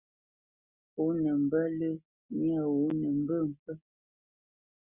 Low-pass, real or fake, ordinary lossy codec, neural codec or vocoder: 3.6 kHz; real; Opus, 64 kbps; none